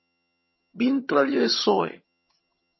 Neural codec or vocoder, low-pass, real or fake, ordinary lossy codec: vocoder, 22.05 kHz, 80 mel bands, HiFi-GAN; 7.2 kHz; fake; MP3, 24 kbps